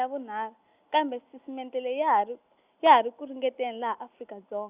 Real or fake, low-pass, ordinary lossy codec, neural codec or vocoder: real; 3.6 kHz; Opus, 64 kbps; none